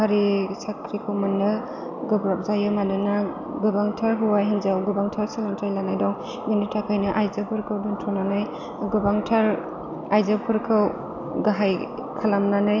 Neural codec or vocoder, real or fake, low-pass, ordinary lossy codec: none; real; 7.2 kHz; none